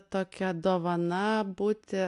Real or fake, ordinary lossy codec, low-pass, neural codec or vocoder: real; AAC, 64 kbps; 10.8 kHz; none